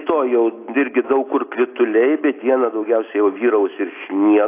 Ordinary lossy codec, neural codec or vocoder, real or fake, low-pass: AAC, 24 kbps; none; real; 3.6 kHz